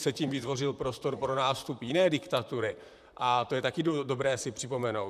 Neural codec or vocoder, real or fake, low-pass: vocoder, 44.1 kHz, 128 mel bands, Pupu-Vocoder; fake; 14.4 kHz